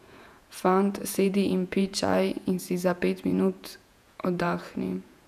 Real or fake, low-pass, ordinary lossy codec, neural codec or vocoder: fake; 14.4 kHz; none; vocoder, 48 kHz, 128 mel bands, Vocos